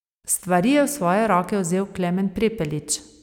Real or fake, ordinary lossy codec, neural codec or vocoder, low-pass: real; none; none; 19.8 kHz